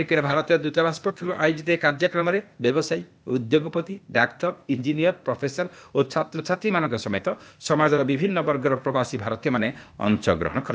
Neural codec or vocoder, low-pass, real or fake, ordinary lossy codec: codec, 16 kHz, 0.8 kbps, ZipCodec; none; fake; none